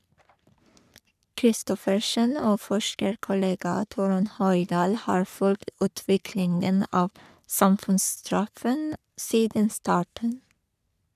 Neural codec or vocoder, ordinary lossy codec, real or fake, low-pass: codec, 44.1 kHz, 3.4 kbps, Pupu-Codec; none; fake; 14.4 kHz